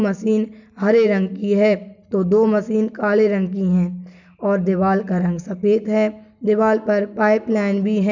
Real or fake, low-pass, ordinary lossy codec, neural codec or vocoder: real; 7.2 kHz; none; none